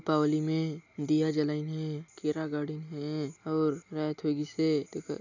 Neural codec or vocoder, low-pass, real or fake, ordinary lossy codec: none; 7.2 kHz; real; none